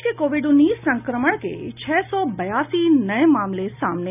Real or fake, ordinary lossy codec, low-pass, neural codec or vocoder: real; none; 3.6 kHz; none